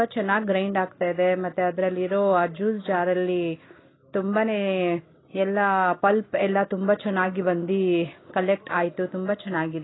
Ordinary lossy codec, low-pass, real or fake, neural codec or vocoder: AAC, 16 kbps; 7.2 kHz; real; none